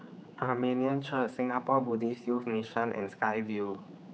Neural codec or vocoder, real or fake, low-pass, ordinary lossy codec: codec, 16 kHz, 4 kbps, X-Codec, HuBERT features, trained on general audio; fake; none; none